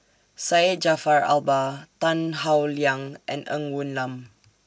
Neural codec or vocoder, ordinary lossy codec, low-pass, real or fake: none; none; none; real